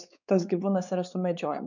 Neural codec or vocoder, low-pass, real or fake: codec, 16 kHz, 4 kbps, X-Codec, WavLM features, trained on Multilingual LibriSpeech; 7.2 kHz; fake